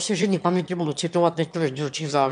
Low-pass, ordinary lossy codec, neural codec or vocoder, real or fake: 9.9 kHz; AAC, 96 kbps; autoencoder, 22.05 kHz, a latent of 192 numbers a frame, VITS, trained on one speaker; fake